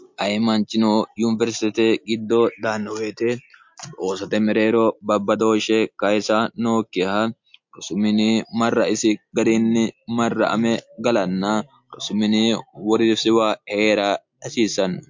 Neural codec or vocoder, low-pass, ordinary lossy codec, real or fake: none; 7.2 kHz; MP3, 48 kbps; real